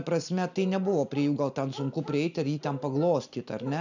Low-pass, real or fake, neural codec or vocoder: 7.2 kHz; real; none